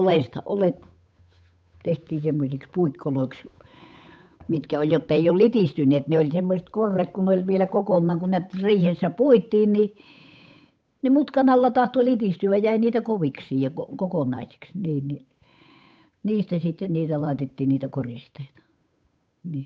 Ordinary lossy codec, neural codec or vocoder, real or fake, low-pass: none; codec, 16 kHz, 8 kbps, FunCodec, trained on Chinese and English, 25 frames a second; fake; none